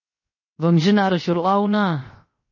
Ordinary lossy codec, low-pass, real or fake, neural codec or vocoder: MP3, 32 kbps; 7.2 kHz; fake; codec, 16 kHz, 0.7 kbps, FocalCodec